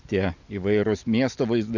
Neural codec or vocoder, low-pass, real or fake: none; 7.2 kHz; real